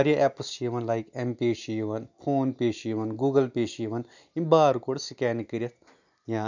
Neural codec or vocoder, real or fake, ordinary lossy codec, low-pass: none; real; none; 7.2 kHz